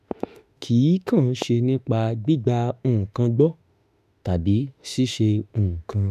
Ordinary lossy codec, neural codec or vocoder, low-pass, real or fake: none; autoencoder, 48 kHz, 32 numbers a frame, DAC-VAE, trained on Japanese speech; 14.4 kHz; fake